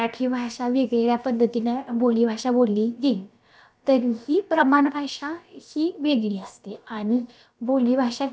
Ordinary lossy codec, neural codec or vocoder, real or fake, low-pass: none; codec, 16 kHz, about 1 kbps, DyCAST, with the encoder's durations; fake; none